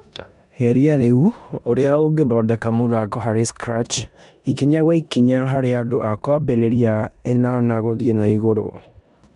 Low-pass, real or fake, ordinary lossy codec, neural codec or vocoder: 10.8 kHz; fake; none; codec, 16 kHz in and 24 kHz out, 0.9 kbps, LongCat-Audio-Codec, four codebook decoder